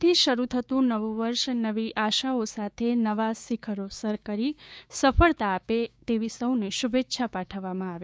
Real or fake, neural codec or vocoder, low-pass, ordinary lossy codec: fake; codec, 16 kHz, 6 kbps, DAC; none; none